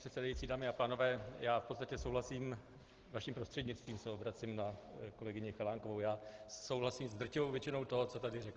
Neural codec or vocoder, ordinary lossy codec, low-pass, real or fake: none; Opus, 16 kbps; 7.2 kHz; real